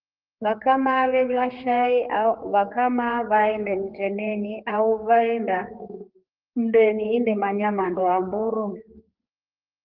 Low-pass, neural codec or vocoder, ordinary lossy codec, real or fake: 5.4 kHz; codec, 16 kHz, 4 kbps, X-Codec, HuBERT features, trained on general audio; Opus, 24 kbps; fake